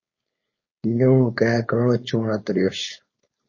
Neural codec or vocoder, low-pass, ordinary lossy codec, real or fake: codec, 16 kHz, 4.8 kbps, FACodec; 7.2 kHz; MP3, 32 kbps; fake